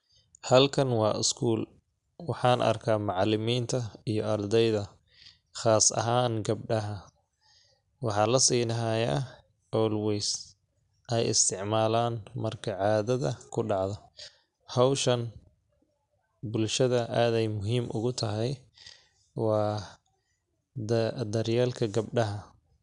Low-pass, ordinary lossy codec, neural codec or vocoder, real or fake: 10.8 kHz; none; none; real